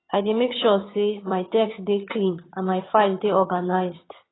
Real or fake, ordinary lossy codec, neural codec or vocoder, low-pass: fake; AAC, 16 kbps; vocoder, 22.05 kHz, 80 mel bands, HiFi-GAN; 7.2 kHz